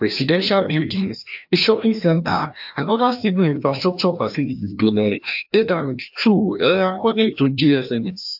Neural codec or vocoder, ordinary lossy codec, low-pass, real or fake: codec, 16 kHz, 1 kbps, FreqCodec, larger model; AAC, 48 kbps; 5.4 kHz; fake